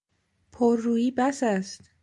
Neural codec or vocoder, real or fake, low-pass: none; real; 10.8 kHz